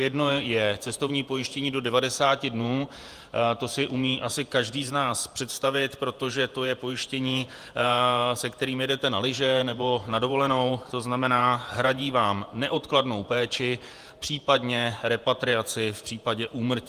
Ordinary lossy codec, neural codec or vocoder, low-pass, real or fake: Opus, 32 kbps; vocoder, 48 kHz, 128 mel bands, Vocos; 14.4 kHz; fake